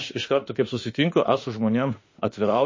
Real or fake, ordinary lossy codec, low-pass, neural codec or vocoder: fake; MP3, 32 kbps; 7.2 kHz; autoencoder, 48 kHz, 32 numbers a frame, DAC-VAE, trained on Japanese speech